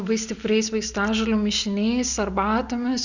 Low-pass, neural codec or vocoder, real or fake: 7.2 kHz; none; real